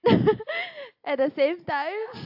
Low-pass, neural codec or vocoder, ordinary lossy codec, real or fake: 5.4 kHz; none; AAC, 48 kbps; real